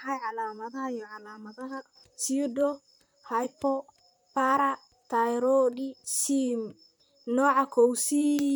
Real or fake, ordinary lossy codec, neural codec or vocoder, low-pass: fake; none; vocoder, 44.1 kHz, 128 mel bands, Pupu-Vocoder; none